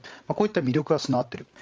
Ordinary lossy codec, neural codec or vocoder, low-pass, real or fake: none; codec, 16 kHz, 8 kbps, FreqCodec, larger model; none; fake